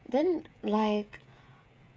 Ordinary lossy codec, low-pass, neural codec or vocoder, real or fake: none; none; codec, 16 kHz, 16 kbps, FreqCodec, smaller model; fake